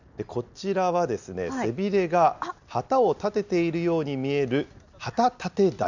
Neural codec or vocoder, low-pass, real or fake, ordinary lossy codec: none; 7.2 kHz; real; none